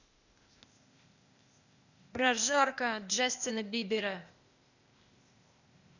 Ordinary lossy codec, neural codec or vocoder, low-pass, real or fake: none; codec, 16 kHz, 0.8 kbps, ZipCodec; 7.2 kHz; fake